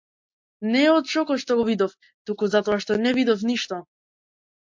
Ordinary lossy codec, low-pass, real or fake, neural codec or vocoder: MP3, 48 kbps; 7.2 kHz; real; none